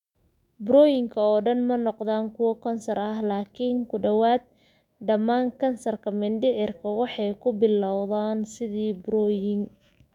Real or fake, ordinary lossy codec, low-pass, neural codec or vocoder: fake; none; 19.8 kHz; autoencoder, 48 kHz, 128 numbers a frame, DAC-VAE, trained on Japanese speech